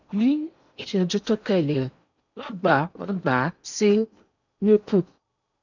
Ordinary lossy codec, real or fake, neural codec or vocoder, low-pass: none; fake; codec, 16 kHz in and 24 kHz out, 0.8 kbps, FocalCodec, streaming, 65536 codes; 7.2 kHz